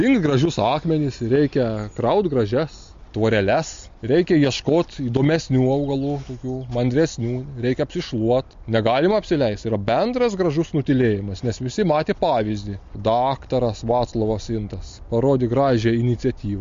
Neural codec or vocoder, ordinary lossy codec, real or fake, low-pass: none; MP3, 48 kbps; real; 7.2 kHz